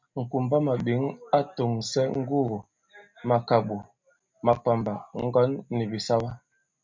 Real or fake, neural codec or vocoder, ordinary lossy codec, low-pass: real; none; MP3, 64 kbps; 7.2 kHz